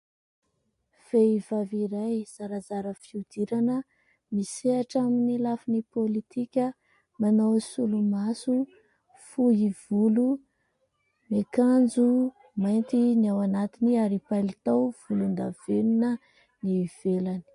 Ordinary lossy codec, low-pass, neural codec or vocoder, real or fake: MP3, 48 kbps; 10.8 kHz; none; real